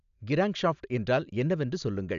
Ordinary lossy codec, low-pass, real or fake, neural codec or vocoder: none; 7.2 kHz; real; none